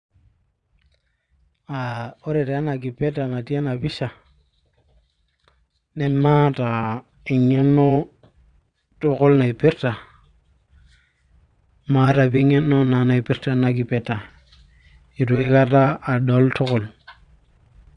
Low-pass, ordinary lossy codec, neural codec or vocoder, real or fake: 9.9 kHz; none; vocoder, 22.05 kHz, 80 mel bands, Vocos; fake